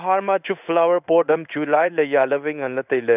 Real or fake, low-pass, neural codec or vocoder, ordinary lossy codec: fake; 3.6 kHz; codec, 16 kHz in and 24 kHz out, 1 kbps, XY-Tokenizer; none